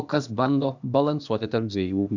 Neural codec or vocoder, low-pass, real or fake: codec, 16 kHz, 0.8 kbps, ZipCodec; 7.2 kHz; fake